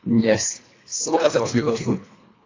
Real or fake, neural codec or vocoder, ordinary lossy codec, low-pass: fake; codec, 24 kHz, 1.5 kbps, HILCodec; AAC, 32 kbps; 7.2 kHz